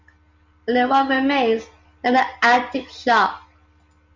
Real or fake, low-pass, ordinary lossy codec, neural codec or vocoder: real; 7.2 kHz; MP3, 64 kbps; none